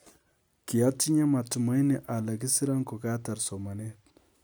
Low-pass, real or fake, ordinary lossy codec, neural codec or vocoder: none; real; none; none